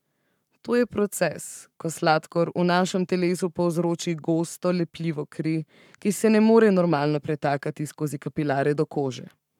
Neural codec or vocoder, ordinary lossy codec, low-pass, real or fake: codec, 44.1 kHz, 7.8 kbps, Pupu-Codec; none; 19.8 kHz; fake